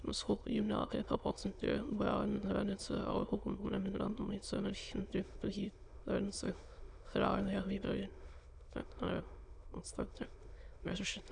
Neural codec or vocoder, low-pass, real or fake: autoencoder, 22.05 kHz, a latent of 192 numbers a frame, VITS, trained on many speakers; 9.9 kHz; fake